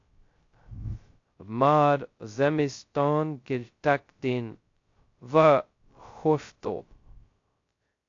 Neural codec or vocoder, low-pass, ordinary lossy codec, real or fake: codec, 16 kHz, 0.2 kbps, FocalCodec; 7.2 kHz; AAC, 48 kbps; fake